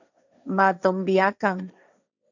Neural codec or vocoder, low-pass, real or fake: codec, 16 kHz, 1.1 kbps, Voila-Tokenizer; 7.2 kHz; fake